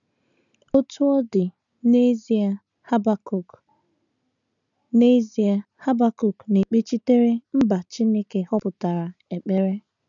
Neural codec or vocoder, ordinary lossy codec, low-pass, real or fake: none; none; 7.2 kHz; real